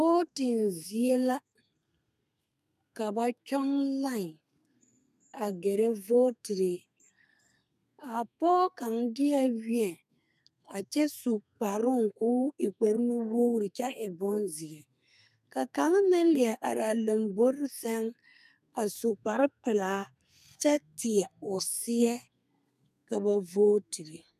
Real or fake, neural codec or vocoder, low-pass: fake; codec, 32 kHz, 1.9 kbps, SNAC; 14.4 kHz